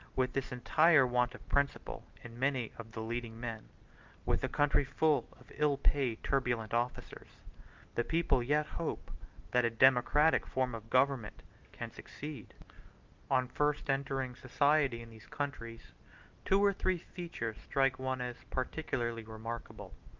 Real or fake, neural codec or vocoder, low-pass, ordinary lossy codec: real; none; 7.2 kHz; Opus, 32 kbps